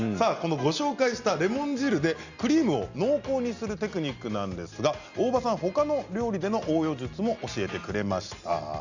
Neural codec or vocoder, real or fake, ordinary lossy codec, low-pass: none; real; Opus, 64 kbps; 7.2 kHz